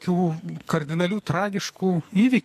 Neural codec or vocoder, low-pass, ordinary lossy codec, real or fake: codec, 44.1 kHz, 2.6 kbps, SNAC; 14.4 kHz; MP3, 64 kbps; fake